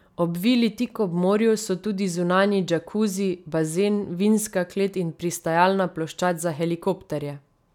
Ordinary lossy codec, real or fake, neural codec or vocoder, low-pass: none; real; none; 19.8 kHz